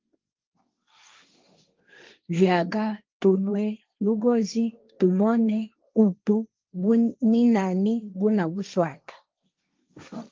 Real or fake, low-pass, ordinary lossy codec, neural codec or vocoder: fake; 7.2 kHz; Opus, 24 kbps; codec, 16 kHz, 1.1 kbps, Voila-Tokenizer